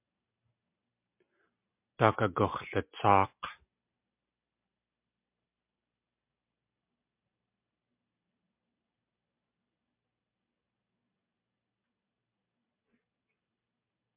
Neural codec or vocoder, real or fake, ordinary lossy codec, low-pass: none; real; MP3, 32 kbps; 3.6 kHz